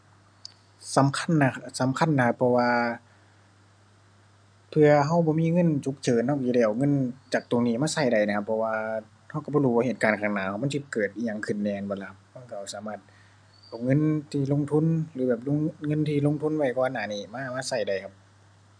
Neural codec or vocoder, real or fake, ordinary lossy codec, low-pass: none; real; none; 9.9 kHz